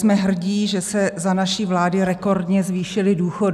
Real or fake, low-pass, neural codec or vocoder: real; 14.4 kHz; none